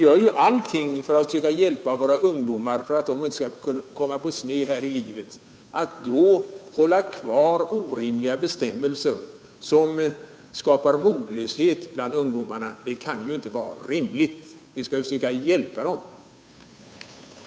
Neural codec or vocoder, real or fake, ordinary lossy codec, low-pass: codec, 16 kHz, 2 kbps, FunCodec, trained on Chinese and English, 25 frames a second; fake; none; none